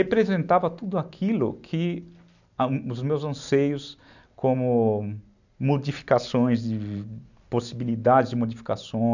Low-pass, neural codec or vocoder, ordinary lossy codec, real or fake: 7.2 kHz; none; none; real